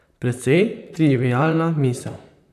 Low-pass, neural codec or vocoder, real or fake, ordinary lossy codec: 14.4 kHz; vocoder, 44.1 kHz, 128 mel bands, Pupu-Vocoder; fake; none